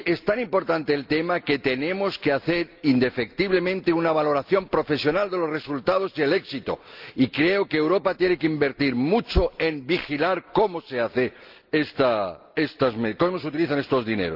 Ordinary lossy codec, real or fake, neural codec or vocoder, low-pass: Opus, 24 kbps; real; none; 5.4 kHz